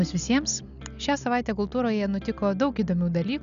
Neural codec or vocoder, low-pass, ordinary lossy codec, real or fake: none; 7.2 kHz; MP3, 96 kbps; real